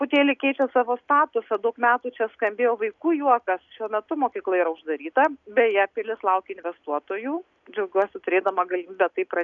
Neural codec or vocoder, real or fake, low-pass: none; real; 7.2 kHz